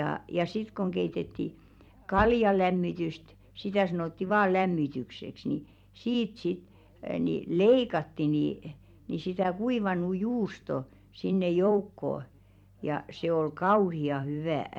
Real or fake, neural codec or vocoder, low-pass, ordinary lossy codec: fake; vocoder, 44.1 kHz, 128 mel bands every 256 samples, BigVGAN v2; 19.8 kHz; MP3, 96 kbps